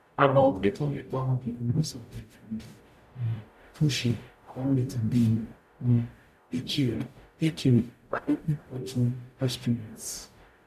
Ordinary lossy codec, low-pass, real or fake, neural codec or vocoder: none; 14.4 kHz; fake; codec, 44.1 kHz, 0.9 kbps, DAC